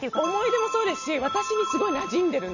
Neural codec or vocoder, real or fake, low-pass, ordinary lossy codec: none; real; 7.2 kHz; none